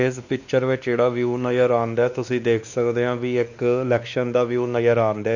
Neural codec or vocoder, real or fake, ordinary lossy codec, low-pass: codec, 16 kHz, 2 kbps, X-Codec, WavLM features, trained on Multilingual LibriSpeech; fake; none; 7.2 kHz